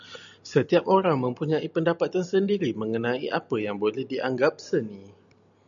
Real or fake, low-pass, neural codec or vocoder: real; 7.2 kHz; none